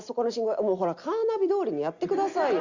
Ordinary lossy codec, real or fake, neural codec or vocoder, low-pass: Opus, 64 kbps; real; none; 7.2 kHz